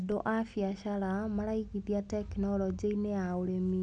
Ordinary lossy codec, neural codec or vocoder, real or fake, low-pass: none; none; real; 10.8 kHz